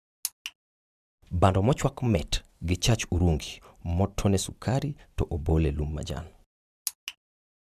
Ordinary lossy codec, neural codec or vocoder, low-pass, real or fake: none; none; 14.4 kHz; real